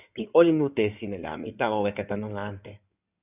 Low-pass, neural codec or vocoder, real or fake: 3.6 kHz; codec, 16 kHz in and 24 kHz out, 2.2 kbps, FireRedTTS-2 codec; fake